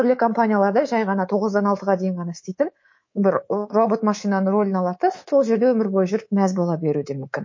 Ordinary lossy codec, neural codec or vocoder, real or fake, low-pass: MP3, 32 kbps; codec, 24 kHz, 3.1 kbps, DualCodec; fake; 7.2 kHz